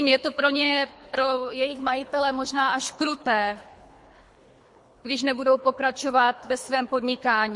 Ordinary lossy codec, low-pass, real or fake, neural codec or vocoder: MP3, 48 kbps; 10.8 kHz; fake; codec, 24 kHz, 3 kbps, HILCodec